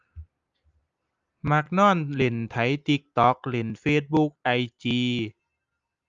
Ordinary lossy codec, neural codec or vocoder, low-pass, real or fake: Opus, 32 kbps; none; 7.2 kHz; real